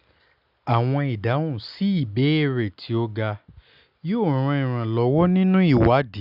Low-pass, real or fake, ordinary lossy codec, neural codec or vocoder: 5.4 kHz; real; none; none